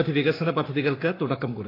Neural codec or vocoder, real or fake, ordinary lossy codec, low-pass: codec, 44.1 kHz, 7.8 kbps, Pupu-Codec; fake; AAC, 24 kbps; 5.4 kHz